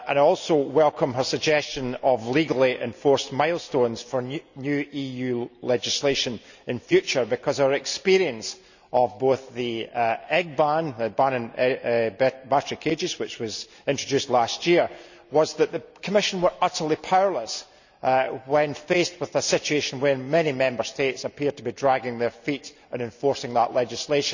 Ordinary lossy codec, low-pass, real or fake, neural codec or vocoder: none; 7.2 kHz; real; none